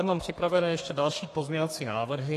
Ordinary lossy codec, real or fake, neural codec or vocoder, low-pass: AAC, 48 kbps; fake; codec, 32 kHz, 1.9 kbps, SNAC; 14.4 kHz